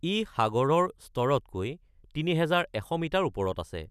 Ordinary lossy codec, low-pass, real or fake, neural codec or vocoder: none; 14.4 kHz; real; none